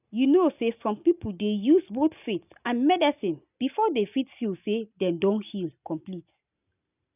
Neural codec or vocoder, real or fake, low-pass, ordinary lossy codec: none; real; 3.6 kHz; none